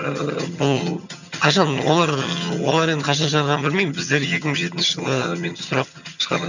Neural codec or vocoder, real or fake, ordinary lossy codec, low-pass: vocoder, 22.05 kHz, 80 mel bands, HiFi-GAN; fake; none; 7.2 kHz